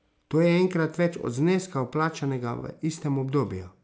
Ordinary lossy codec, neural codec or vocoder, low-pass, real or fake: none; none; none; real